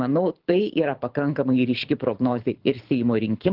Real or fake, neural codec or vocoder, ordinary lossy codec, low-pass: fake; codec, 24 kHz, 6 kbps, HILCodec; Opus, 16 kbps; 5.4 kHz